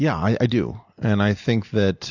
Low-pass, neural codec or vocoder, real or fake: 7.2 kHz; none; real